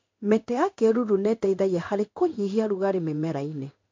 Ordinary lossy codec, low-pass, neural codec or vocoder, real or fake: MP3, 64 kbps; 7.2 kHz; codec, 16 kHz in and 24 kHz out, 1 kbps, XY-Tokenizer; fake